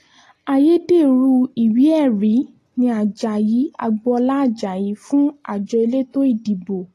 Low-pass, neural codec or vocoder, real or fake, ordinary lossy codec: 14.4 kHz; none; real; AAC, 48 kbps